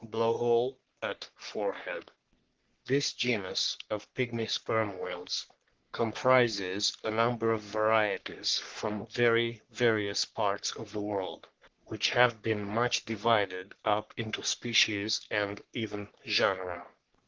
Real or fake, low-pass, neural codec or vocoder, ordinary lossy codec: fake; 7.2 kHz; codec, 44.1 kHz, 3.4 kbps, Pupu-Codec; Opus, 16 kbps